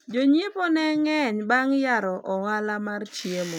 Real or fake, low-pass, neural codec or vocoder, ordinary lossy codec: real; 19.8 kHz; none; none